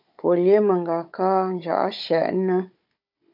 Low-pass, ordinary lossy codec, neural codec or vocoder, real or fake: 5.4 kHz; MP3, 48 kbps; codec, 16 kHz, 16 kbps, FunCodec, trained on Chinese and English, 50 frames a second; fake